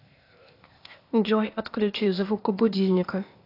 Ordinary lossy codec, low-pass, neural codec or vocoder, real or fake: AAC, 32 kbps; 5.4 kHz; codec, 16 kHz, 0.8 kbps, ZipCodec; fake